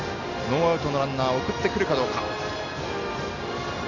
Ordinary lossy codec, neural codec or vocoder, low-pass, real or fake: none; none; 7.2 kHz; real